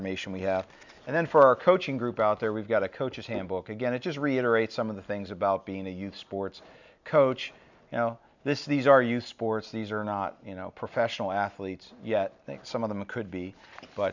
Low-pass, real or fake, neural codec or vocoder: 7.2 kHz; real; none